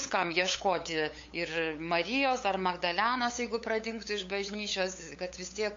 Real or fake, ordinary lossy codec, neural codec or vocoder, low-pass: fake; MP3, 48 kbps; codec, 16 kHz, 8 kbps, FunCodec, trained on LibriTTS, 25 frames a second; 7.2 kHz